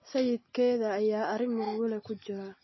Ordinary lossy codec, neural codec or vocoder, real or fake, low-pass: MP3, 24 kbps; none; real; 7.2 kHz